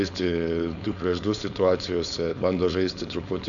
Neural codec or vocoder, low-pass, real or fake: codec, 16 kHz, 4.8 kbps, FACodec; 7.2 kHz; fake